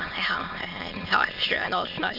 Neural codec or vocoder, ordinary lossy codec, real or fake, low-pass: autoencoder, 22.05 kHz, a latent of 192 numbers a frame, VITS, trained on many speakers; none; fake; 5.4 kHz